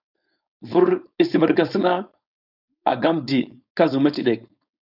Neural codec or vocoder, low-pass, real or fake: codec, 16 kHz, 4.8 kbps, FACodec; 5.4 kHz; fake